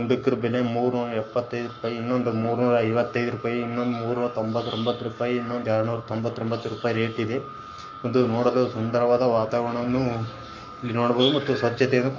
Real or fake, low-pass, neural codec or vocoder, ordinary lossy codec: fake; 7.2 kHz; codec, 44.1 kHz, 7.8 kbps, Pupu-Codec; MP3, 48 kbps